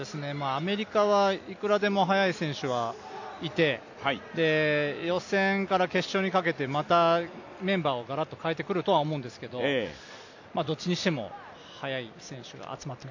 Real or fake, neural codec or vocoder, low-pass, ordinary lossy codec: real; none; 7.2 kHz; AAC, 48 kbps